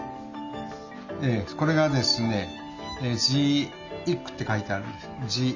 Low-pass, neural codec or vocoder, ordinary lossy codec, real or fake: 7.2 kHz; none; Opus, 64 kbps; real